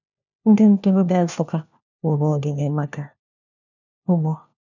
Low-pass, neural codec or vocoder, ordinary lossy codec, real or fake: 7.2 kHz; codec, 16 kHz, 1 kbps, FunCodec, trained on LibriTTS, 50 frames a second; none; fake